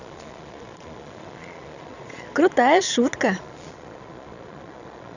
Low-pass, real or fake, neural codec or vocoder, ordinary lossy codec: 7.2 kHz; fake; vocoder, 22.05 kHz, 80 mel bands, Vocos; none